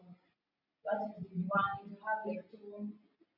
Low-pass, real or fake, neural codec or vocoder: 5.4 kHz; real; none